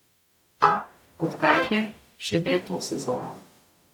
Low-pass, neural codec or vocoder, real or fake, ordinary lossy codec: 19.8 kHz; codec, 44.1 kHz, 0.9 kbps, DAC; fake; none